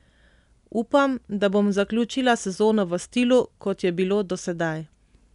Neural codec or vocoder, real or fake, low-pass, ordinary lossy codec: none; real; 10.8 kHz; none